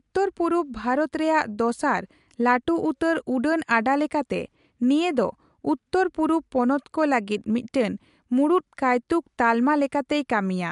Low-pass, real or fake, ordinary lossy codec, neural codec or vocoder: 10.8 kHz; real; MP3, 64 kbps; none